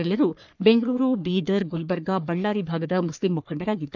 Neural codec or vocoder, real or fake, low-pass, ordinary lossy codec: codec, 44.1 kHz, 3.4 kbps, Pupu-Codec; fake; 7.2 kHz; none